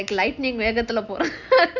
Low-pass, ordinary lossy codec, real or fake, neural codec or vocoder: 7.2 kHz; none; real; none